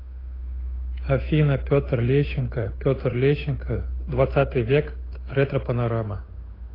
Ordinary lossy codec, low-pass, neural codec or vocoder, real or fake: AAC, 24 kbps; 5.4 kHz; codec, 16 kHz, 8 kbps, FunCodec, trained on Chinese and English, 25 frames a second; fake